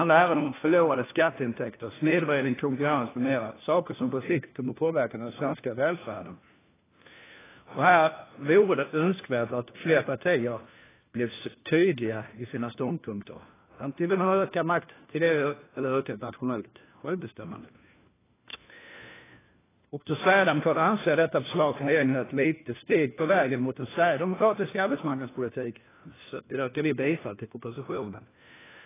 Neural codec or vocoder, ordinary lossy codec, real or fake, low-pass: codec, 16 kHz, 1 kbps, FunCodec, trained on LibriTTS, 50 frames a second; AAC, 16 kbps; fake; 3.6 kHz